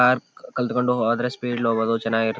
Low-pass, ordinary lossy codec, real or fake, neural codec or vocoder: none; none; real; none